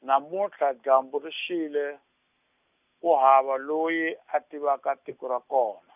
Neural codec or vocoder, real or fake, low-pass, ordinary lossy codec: none; real; 3.6 kHz; none